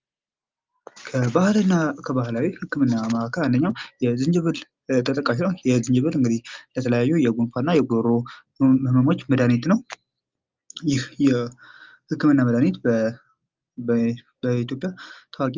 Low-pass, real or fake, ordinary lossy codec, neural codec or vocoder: 7.2 kHz; real; Opus, 32 kbps; none